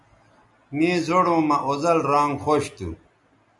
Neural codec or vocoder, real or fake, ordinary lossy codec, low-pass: none; real; MP3, 96 kbps; 10.8 kHz